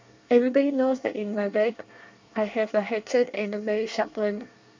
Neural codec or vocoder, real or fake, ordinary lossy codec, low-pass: codec, 24 kHz, 1 kbps, SNAC; fake; MP3, 64 kbps; 7.2 kHz